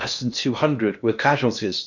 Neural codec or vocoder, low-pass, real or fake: codec, 16 kHz in and 24 kHz out, 0.6 kbps, FocalCodec, streaming, 2048 codes; 7.2 kHz; fake